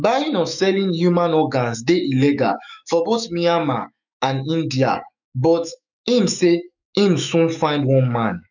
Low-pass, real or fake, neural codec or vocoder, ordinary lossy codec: 7.2 kHz; fake; autoencoder, 48 kHz, 128 numbers a frame, DAC-VAE, trained on Japanese speech; none